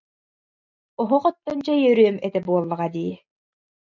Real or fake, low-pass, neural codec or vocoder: real; 7.2 kHz; none